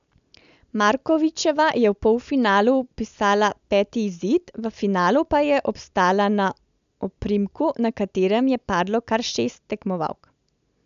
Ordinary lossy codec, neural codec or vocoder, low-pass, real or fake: AAC, 96 kbps; none; 7.2 kHz; real